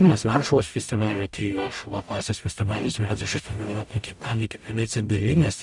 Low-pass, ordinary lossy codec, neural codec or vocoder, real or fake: 10.8 kHz; Opus, 64 kbps; codec, 44.1 kHz, 0.9 kbps, DAC; fake